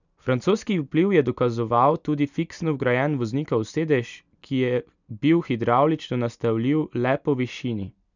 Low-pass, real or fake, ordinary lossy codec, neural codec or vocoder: 7.2 kHz; real; none; none